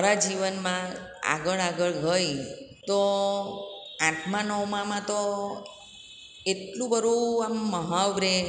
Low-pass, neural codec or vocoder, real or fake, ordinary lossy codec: none; none; real; none